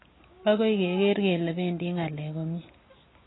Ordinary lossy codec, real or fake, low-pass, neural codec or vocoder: AAC, 16 kbps; real; 7.2 kHz; none